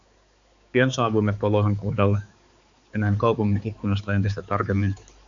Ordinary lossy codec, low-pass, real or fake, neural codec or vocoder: AAC, 64 kbps; 7.2 kHz; fake; codec, 16 kHz, 4 kbps, X-Codec, HuBERT features, trained on balanced general audio